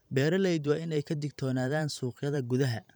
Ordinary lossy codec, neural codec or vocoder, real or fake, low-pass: none; vocoder, 44.1 kHz, 128 mel bands every 512 samples, BigVGAN v2; fake; none